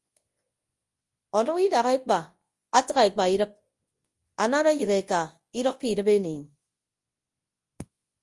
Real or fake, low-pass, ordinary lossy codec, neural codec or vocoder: fake; 10.8 kHz; Opus, 24 kbps; codec, 24 kHz, 0.9 kbps, WavTokenizer, large speech release